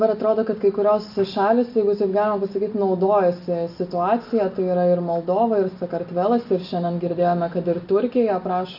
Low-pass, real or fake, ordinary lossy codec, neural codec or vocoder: 5.4 kHz; real; AAC, 48 kbps; none